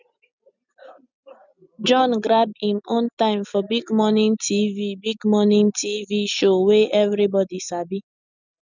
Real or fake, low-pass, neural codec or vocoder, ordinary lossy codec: real; 7.2 kHz; none; none